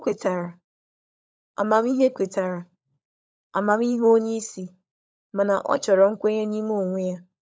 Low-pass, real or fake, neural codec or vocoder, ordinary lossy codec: none; fake; codec, 16 kHz, 16 kbps, FunCodec, trained on LibriTTS, 50 frames a second; none